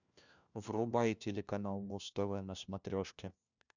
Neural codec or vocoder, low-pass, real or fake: codec, 16 kHz, 1 kbps, FunCodec, trained on LibriTTS, 50 frames a second; 7.2 kHz; fake